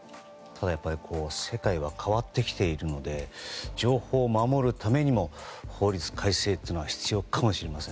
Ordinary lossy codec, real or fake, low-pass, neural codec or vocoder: none; real; none; none